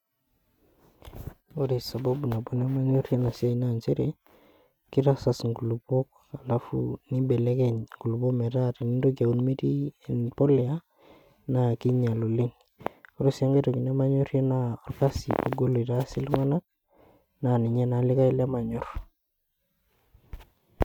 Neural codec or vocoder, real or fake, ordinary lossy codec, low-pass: none; real; none; 19.8 kHz